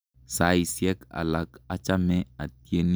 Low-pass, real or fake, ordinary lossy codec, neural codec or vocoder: none; real; none; none